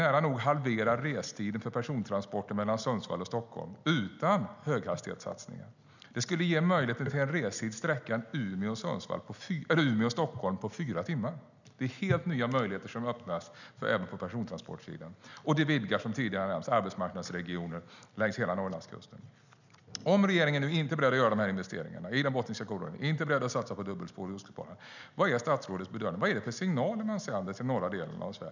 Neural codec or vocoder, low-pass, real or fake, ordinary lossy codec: none; 7.2 kHz; real; none